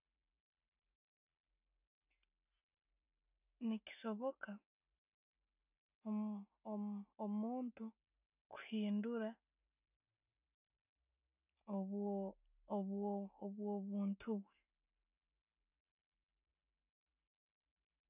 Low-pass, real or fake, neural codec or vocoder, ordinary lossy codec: 3.6 kHz; real; none; none